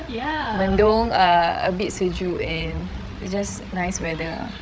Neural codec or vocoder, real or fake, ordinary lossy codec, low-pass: codec, 16 kHz, 8 kbps, FreqCodec, larger model; fake; none; none